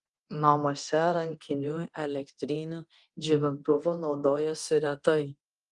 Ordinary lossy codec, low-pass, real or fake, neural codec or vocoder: Opus, 24 kbps; 10.8 kHz; fake; codec, 24 kHz, 0.9 kbps, DualCodec